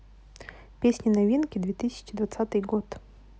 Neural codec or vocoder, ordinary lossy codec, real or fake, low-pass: none; none; real; none